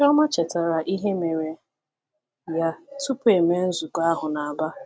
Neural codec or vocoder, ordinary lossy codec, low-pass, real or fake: none; none; none; real